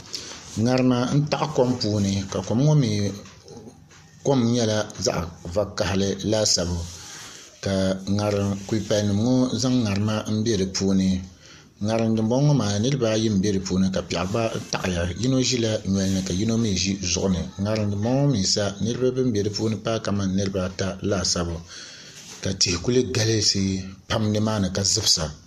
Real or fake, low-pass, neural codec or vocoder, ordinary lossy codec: real; 14.4 kHz; none; MP3, 64 kbps